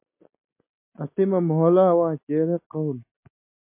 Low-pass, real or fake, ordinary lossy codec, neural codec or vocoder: 3.6 kHz; real; MP3, 24 kbps; none